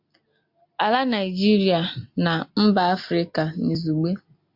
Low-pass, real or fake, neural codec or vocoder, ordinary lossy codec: 5.4 kHz; real; none; MP3, 48 kbps